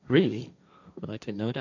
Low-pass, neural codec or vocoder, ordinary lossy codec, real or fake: 7.2 kHz; codec, 16 kHz, 1.1 kbps, Voila-Tokenizer; none; fake